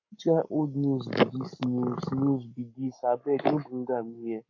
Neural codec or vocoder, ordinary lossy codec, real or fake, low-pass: codec, 44.1 kHz, 7.8 kbps, Pupu-Codec; AAC, 48 kbps; fake; 7.2 kHz